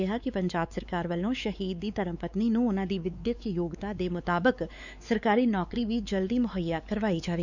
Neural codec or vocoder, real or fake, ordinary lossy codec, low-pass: codec, 16 kHz, 4 kbps, X-Codec, WavLM features, trained on Multilingual LibriSpeech; fake; none; 7.2 kHz